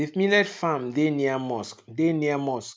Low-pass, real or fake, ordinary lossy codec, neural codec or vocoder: none; real; none; none